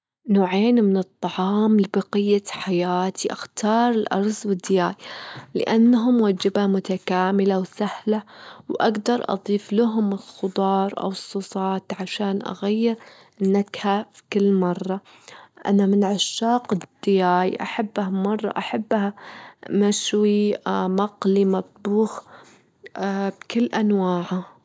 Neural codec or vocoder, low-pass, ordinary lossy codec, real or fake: none; none; none; real